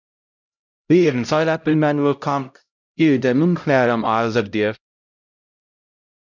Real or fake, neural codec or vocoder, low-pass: fake; codec, 16 kHz, 0.5 kbps, X-Codec, HuBERT features, trained on LibriSpeech; 7.2 kHz